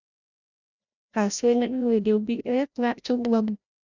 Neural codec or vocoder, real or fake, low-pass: codec, 16 kHz, 0.5 kbps, FreqCodec, larger model; fake; 7.2 kHz